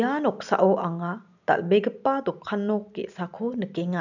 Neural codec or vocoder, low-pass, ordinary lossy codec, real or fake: none; 7.2 kHz; none; real